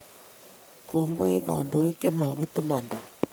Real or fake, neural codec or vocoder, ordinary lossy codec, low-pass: fake; codec, 44.1 kHz, 1.7 kbps, Pupu-Codec; none; none